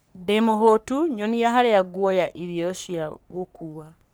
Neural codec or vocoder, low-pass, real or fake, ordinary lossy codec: codec, 44.1 kHz, 3.4 kbps, Pupu-Codec; none; fake; none